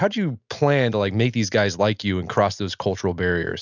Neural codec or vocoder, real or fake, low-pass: none; real; 7.2 kHz